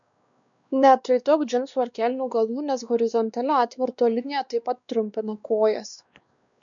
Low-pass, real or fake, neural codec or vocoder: 7.2 kHz; fake; codec, 16 kHz, 2 kbps, X-Codec, WavLM features, trained on Multilingual LibriSpeech